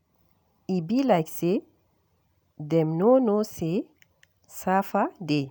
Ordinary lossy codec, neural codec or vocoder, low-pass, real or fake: none; none; none; real